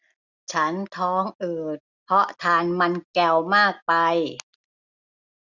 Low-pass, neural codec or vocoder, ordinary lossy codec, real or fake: 7.2 kHz; none; none; real